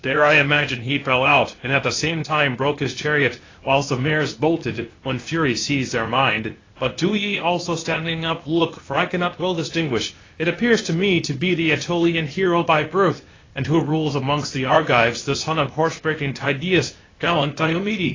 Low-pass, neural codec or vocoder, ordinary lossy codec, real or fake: 7.2 kHz; codec, 16 kHz, 0.8 kbps, ZipCodec; AAC, 32 kbps; fake